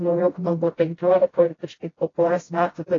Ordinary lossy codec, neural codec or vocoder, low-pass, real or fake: AAC, 32 kbps; codec, 16 kHz, 0.5 kbps, FreqCodec, smaller model; 7.2 kHz; fake